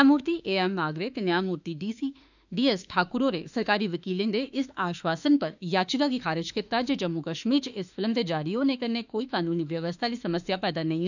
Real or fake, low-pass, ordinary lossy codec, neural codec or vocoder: fake; 7.2 kHz; none; autoencoder, 48 kHz, 32 numbers a frame, DAC-VAE, trained on Japanese speech